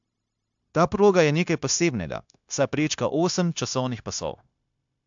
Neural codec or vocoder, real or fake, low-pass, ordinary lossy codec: codec, 16 kHz, 0.9 kbps, LongCat-Audio-Codec; fake; 7.2 kHz; AAC, 64 kbps